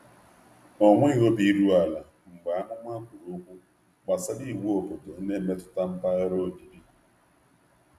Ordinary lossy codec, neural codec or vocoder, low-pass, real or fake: none; none; 14.4 kHz; real